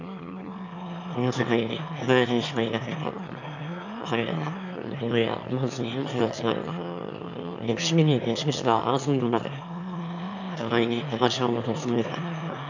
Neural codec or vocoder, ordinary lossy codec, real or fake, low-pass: autoencoder, 22.05 kHz, a latent of 192 numbers a frame, VITS, trained on one speaker; none; fake; 7.2 kHz